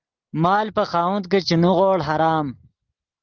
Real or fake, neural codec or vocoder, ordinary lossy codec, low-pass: real; none; Opus, 16 kbps; 7.2 kHz